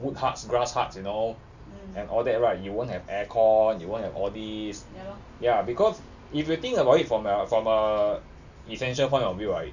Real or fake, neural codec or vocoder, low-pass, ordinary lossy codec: real; none; 7.2 kHz; none